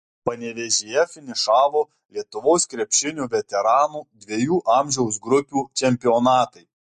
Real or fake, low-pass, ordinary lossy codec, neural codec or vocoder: real; 14.4 kHz; MP3, 48 kbps; none